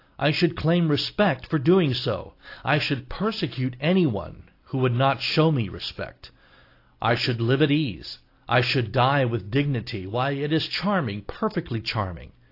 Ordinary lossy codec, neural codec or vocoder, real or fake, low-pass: AAC, 32 kbps; none; real; 5.4 kHz